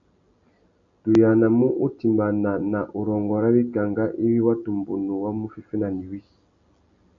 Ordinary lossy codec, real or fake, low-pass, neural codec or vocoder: AAC, 64 kbps; real; 7.2 kHz; none